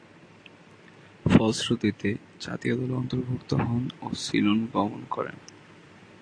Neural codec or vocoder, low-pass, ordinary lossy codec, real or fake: none; 9.9 kHz; AAC, 48 kbps; real